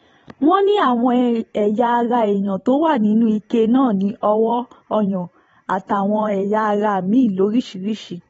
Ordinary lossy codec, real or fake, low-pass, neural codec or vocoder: AAC, 24 kbps; fake; 19.8 kHz; vocoder, 44.1 kHz, 128 mel bands every 512 samples, BigVGAN v2